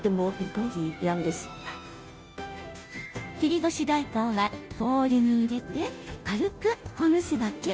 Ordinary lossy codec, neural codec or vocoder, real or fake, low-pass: none; codec, 16 kHz, 0.5 kbps, FunCodec, trained on Chinese and English, 25 frames a second; fake; none